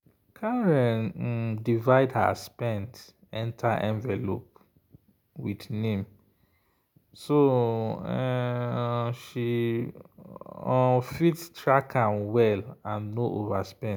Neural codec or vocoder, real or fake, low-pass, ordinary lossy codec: none; real; none; none